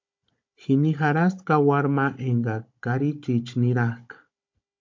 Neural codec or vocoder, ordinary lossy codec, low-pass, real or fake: codec, 16 kHz, 16 kbps, FunCodec, trained on Chinese and English, 50 frames a second; MP3, 48 kbps; 7.2 kHz; fake